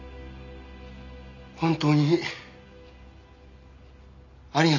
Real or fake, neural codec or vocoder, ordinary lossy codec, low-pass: real; none; none; 7.2 kHz